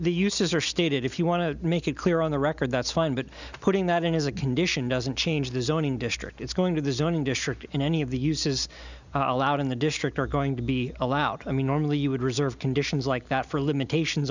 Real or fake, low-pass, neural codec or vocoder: real; 7.2 kHz; none